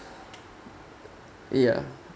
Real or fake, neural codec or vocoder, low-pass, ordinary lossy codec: real; none; none; none